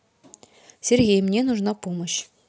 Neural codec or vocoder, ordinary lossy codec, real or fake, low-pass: none; none; real; none